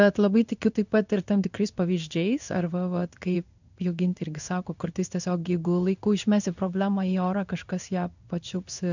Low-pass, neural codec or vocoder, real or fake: 7.2 kHz; codec, 16 kHz in and 24 kHz out, 1 kbps, XY-Tokenizer; fake